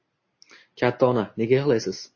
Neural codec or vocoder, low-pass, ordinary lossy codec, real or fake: none; 7.2 kHz; MP3, 32 kbps; real